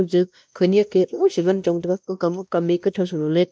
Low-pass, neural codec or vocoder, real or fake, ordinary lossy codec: none; codec, 16 kHz, 1 kbps, X-Codec, WavLM features, trained on Multilingual LibriSpeech; fake; none